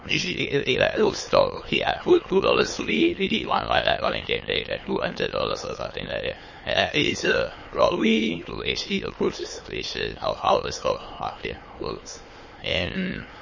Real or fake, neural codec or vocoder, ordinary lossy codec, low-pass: fake; autoencoder, 22.05 kHz, a latent of 192 numbers a frame, VITS, trained on many speakers; MP3, 32 kbps; 7.2 kHz